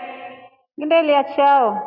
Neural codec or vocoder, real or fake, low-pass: none; real; 5.4 kHz